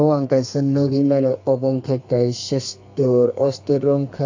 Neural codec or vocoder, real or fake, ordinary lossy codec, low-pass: codec, 32 kHz, 1.9 kbps, SNAC; fake; AAC, 48 kbps; 7.2 kHz